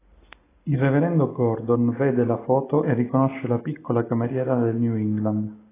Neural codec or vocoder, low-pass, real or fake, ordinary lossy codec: none; 3.6 kHz; real; AAC, 16 kbps